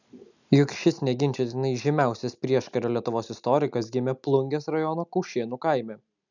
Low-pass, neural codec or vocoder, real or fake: 7.2 kHz; none; real